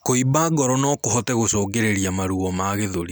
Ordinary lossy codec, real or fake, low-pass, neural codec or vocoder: none; real; none; none